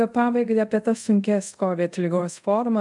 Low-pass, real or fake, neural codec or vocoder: 10.8 kHz; fake; codec, 24 kHz, 0.5 kbps, DualCodec